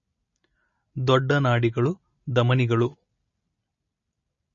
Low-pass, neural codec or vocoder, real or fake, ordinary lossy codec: 7.2 kHz; none; real; MP3, 32 kbps